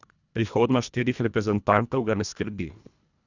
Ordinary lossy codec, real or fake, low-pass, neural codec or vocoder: none; fake; 7.2 kHz; codec, 24 kHz, 1.5 kbps, HILCodec